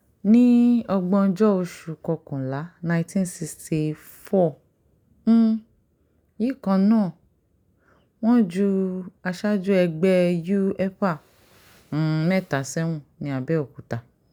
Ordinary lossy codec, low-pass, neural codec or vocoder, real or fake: none; 19.8 kHz; none; real